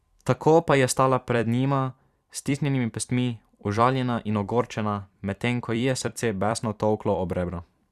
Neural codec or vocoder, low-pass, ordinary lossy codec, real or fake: vocoder, 44.1 kHz, 128 mel bands every 256 samples, BigVGAN v2; 14.4 kHz; Opus, 64 kbps; fake